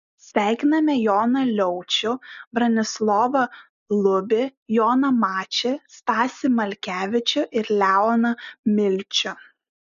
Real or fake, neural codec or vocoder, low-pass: real; none; 7.2 kHz